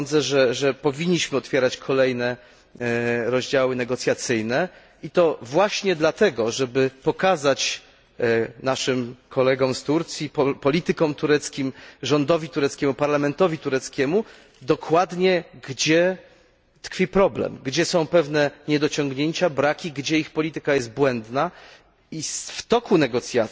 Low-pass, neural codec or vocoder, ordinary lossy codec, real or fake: none; none; none; real